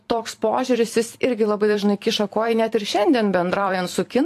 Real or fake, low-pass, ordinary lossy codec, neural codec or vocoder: fake; 14.4 kHz; AAC, 64 kbps; vocoder, 44.1 kHz, 128 mel bands every 256 samples, BigVGAN v2